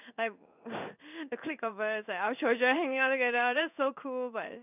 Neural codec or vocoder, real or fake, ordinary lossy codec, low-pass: codec, 16 kHz in and 24 kHz out, 1 kbps, XY-Tokenizer; fake; none; 3.6 kHz